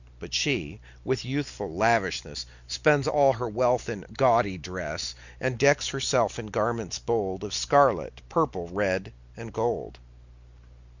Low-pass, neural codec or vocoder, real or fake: 7.2 kHz; none; real